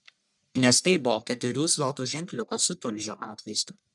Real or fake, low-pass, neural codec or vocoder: fake; 10.8 kHz; codec, 44.1 kHz, 1.7 kbps, Pupu-Codec